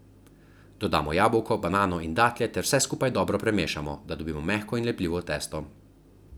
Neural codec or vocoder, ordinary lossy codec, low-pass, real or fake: none; none; none; real